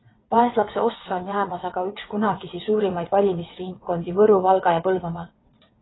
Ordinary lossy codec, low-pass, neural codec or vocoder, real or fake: AAC, 16 kbps; 7.2 kHz; vocoder, 22.05 kHz, 80 mel bands, WaveNeXt; fake